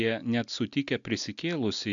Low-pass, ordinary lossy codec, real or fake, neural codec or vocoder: 7.2 kHz; MP3, 64 kbps; real; none